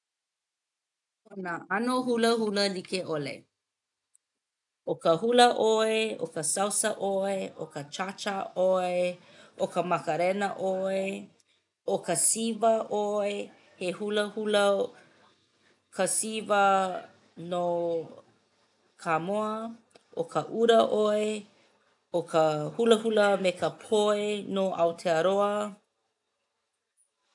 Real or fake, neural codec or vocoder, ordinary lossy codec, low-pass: real; none; none; 10.8 kHz